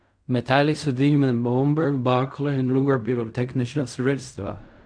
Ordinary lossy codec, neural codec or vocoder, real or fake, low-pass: none; codec, 16 kHz in and 24 kHz out, 0.4 kbps, LongCat-Audio-Codec, fine tuned four codebook decoder; fake; 9.9 kHz